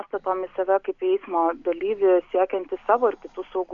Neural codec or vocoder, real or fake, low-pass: none; real; 7.2 kHz